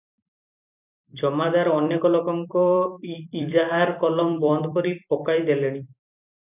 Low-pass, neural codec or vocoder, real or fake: 3.6 kHz; vocoder, 44.1 kHz, 128 mel bands every 256 samples, BigVGAN v2; fake